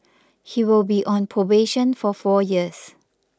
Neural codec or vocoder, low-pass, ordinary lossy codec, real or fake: none; none; none; real